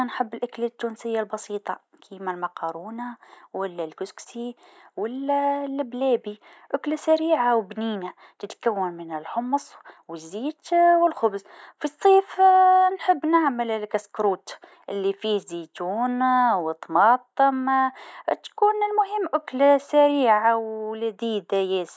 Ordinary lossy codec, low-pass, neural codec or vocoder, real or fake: none; none; none; real